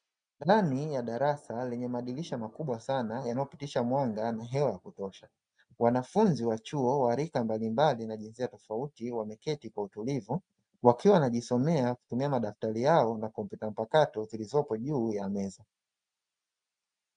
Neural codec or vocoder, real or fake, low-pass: none; real; 9.9 kHz